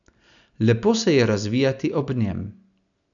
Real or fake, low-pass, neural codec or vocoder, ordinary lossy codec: real; 7.2 kHz; none; none